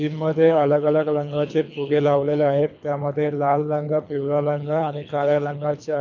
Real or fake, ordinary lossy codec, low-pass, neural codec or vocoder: fake; none; 7.2 kHz; codec, 24 kHz, 3 kbps, HILCodec